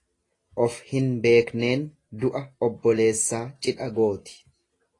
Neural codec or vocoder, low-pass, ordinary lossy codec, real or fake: none; 10.8 kHz; AAC, 32 kbps; real